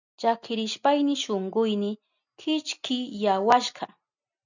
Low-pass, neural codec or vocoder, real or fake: 7.2 kHz; none; real